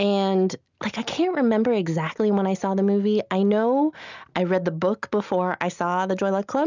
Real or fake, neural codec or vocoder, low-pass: real; none; 7.2 kHz